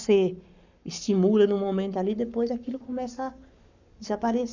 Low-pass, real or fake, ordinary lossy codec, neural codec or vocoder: 7.2 kHz; fake; none; codec, 44.1 kHz, 7.8 kbps, Pupu-Codec